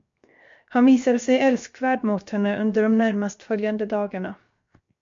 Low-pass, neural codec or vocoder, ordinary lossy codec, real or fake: 7.2 kHz; codec, 16 kHz, 0.7 kbps, FocalCodec; MP3, 48 kbps; fake